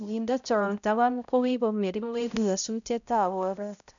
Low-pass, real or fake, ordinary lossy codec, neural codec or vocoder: 7.2 kHz; fake; none; codec, 16 kHz, 0.5 kbps, X-Codec, HuBERT features, trained on balanced general audio